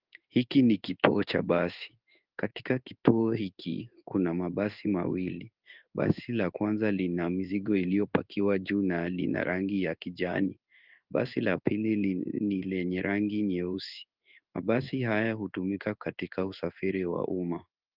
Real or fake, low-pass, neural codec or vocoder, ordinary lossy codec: fake; 5.4 kHz; codec, 16 kHz in and 24 kHz out, 1 kbps, XY-Tokenizer; Opus, 24 kbps